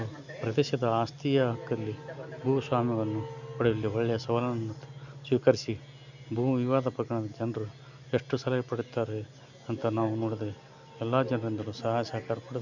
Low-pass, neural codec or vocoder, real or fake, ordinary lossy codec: 7.2 kHz; none; real; none